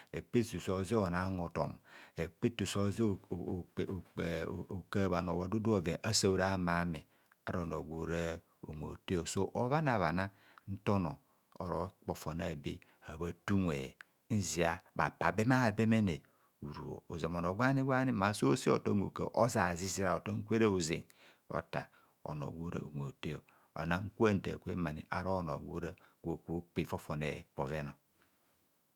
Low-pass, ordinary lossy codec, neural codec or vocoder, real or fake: 19.8 kHz; Opus, 64 kbps; autoencoder, 48 kHz, 128 numbers a frame, DAC-VAE, trained on Japanese speech; fake